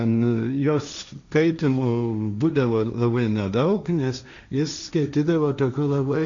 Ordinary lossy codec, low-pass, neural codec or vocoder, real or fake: Opus, 64 kbps; 7.2 kHz; codec, 16 kHz, 1.1 kbps, Voila-Tokenizer; fake